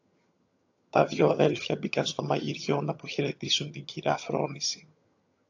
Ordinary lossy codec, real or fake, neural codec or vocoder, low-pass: AAC, 48 kbps; fake; vocoder, 22.05 kHz, 80 mel bands, HiFi-GAN; 7.2 kHz